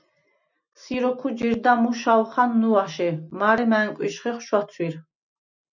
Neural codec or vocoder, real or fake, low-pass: none; real; 7.2 kHz